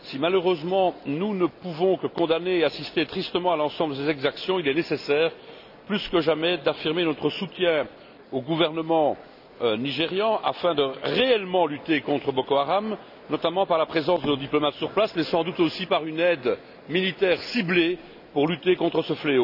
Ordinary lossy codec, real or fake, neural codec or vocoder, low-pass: none; real; none; 5.4 kHz